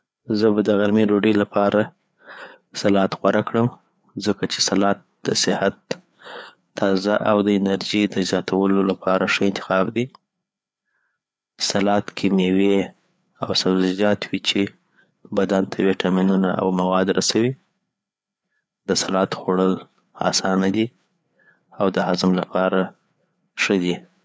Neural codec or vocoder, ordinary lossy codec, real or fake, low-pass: codec, 16 kHz, 4 kbps, FreqCodec, larger model; none; fake; none